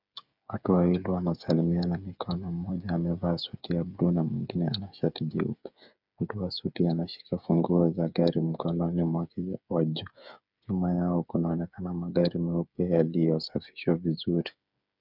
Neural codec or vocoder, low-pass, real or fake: codec, 16 kHz, 8 kbps, FreqCodec, smaller model; 5.4 kHz; fake